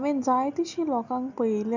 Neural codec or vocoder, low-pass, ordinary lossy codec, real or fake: none; 7.2 kHz; none; real